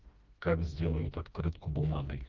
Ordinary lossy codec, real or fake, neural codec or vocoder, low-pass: Opus, 32 kbps; fake; codec, 16 kHz, 1 kbps, FreqCodec, smaller model; 7.2 kHz